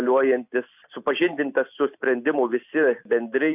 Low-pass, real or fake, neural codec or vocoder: 3.6 kHz; real; none